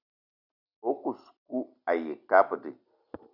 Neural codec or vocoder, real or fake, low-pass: none; real; 5.4 kHz